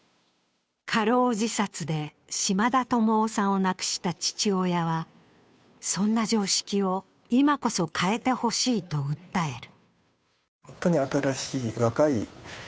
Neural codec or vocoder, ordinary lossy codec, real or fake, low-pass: codec, 16 kHz, 2 kbps, FunCodec, trained on Chinese and English, 25 frames a second; none; fake; none